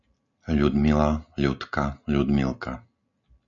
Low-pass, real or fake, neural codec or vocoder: 7.2 kHz; real; none